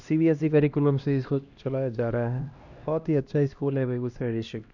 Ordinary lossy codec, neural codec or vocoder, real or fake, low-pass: none; codec, 16 kHz, 1 kbps, X-Codec, HuBERT features, trained on LibriSpeech; fake; 7.2 kHz